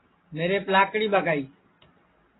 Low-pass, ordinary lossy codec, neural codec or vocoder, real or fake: 7.2 kHz; AAC, 16 kbps; none; real